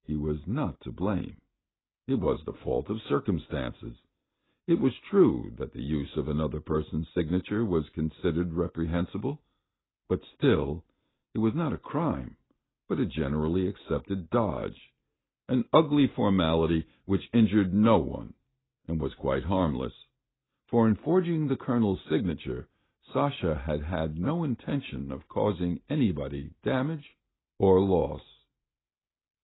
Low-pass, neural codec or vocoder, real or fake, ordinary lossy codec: 7.2 kHz; none; real; AAC, 16 kbps